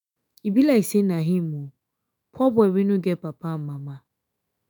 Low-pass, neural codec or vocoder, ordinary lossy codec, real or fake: none; autoencoder, 48 kHz, 128 numbers a frame, DAC-VAE, trained on Japanese speech; none; fake